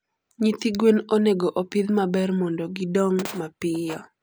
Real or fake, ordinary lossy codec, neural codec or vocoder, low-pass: real; none; none; none